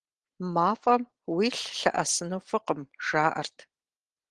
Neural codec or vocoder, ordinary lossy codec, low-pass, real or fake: none; Opus, 16 kbps; 9.9 kHz; real